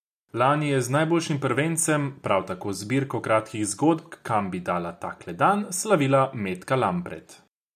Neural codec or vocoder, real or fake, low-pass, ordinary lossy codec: none; real; 14.4 kHz; none